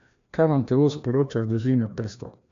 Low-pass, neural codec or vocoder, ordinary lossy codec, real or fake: 7.2 kHz; codec, 16 kHz, 1 kbps, FreqCodec, larger model; AAC, 64 kbps; fake